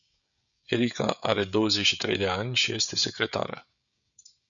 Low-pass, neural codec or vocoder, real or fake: 7.2 kHz; codec, 16 kHz, 16 kbps, FreqCodec, smaller model; fake